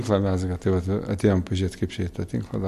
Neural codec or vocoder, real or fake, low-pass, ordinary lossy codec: vocoder, 44.1 kHz, 128 mel bands every 256 samples, BigVGAN v2; fake; 14.4 kHz; MP3, 64 kbps